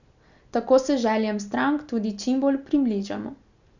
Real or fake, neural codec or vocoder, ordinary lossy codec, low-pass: real; none; none; 7.2 kHz